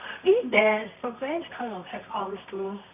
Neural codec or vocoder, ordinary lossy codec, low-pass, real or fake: codec, 24 kHz, 0.9 kbps, WavTokenizer, medium music audio release; none; 3.6 kHz; fake